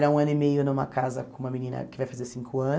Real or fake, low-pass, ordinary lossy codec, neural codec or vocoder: real; none; none; none